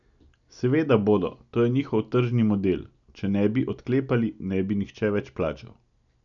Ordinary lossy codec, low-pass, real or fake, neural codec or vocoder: none; 7.2 kHz; real; none